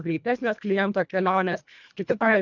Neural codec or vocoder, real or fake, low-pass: codec, 24 kHz, 1.5 kbps, HILCodec; fake; 7.2 kHz